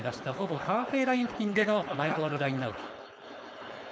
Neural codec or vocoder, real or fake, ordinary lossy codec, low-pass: codec, 16 kHz, 4.8 kbps, FACodec; fake; none; none